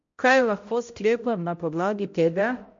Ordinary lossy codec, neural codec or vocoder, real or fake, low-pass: MP3, 48 kbps; codec, 16 kHz, 0.5 kbps, X-Codec, HuBERT features, trained on balanced general audio; fake; 7.2 kHz